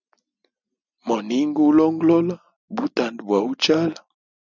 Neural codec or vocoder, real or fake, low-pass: none; real; 7.2 kHz